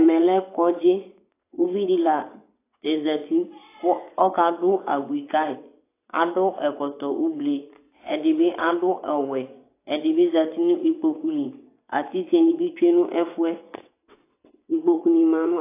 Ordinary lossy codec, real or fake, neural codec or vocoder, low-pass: AAC, 24 kbps; fake; vocoder, 22.05 kHz, 80 mel bands, WaveNeXt; 3.6 kHz